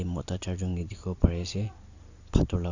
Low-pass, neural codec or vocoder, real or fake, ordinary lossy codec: 7.2 kHz; none; real; none